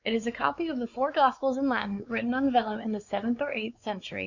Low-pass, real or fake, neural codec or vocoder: 7.2 kHz; fake; codec, 16 kHz, 4 kbps, X-Codec, WavLM features, trained on Multilingual LibriSpeech